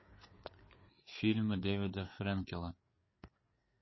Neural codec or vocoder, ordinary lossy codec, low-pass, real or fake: codec, 16 kHz, 4 kbps, FreqCodec, larger model; MP3, 24 kbps; 7.2 kHz; fake